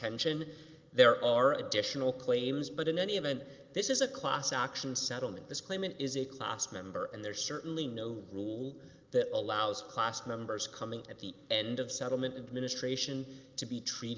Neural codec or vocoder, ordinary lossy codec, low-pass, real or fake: none; Opus, 24 kbps; 7.2 kHz; real